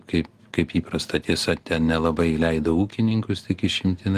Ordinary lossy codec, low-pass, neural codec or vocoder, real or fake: Opus, 24 kbps; 14.4 kHz; none; real